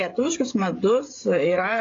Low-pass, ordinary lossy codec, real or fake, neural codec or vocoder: 7.2 kHz; AAC, 32 kbps; fake; codec, 16 kHz, 16 kbps, FreqCodec, smaller model